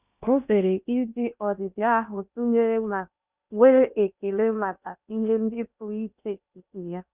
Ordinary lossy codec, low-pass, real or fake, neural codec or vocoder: Opus, 64 kbps; 3.6 kHz; fake; codec, 16 kHz in and 24 kHz out, 0.6 kbps, FocalCodec, streaming, 2048 codes